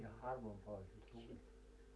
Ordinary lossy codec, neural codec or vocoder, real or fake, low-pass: none; none; real; none